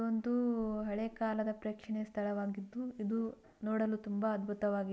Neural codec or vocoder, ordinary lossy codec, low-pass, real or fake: none; none; none; real